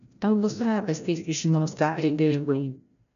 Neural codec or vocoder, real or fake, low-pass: codec, 16 kHz, 0.5 kbps, FreqCodec, larger model; fake; 7.2 kHz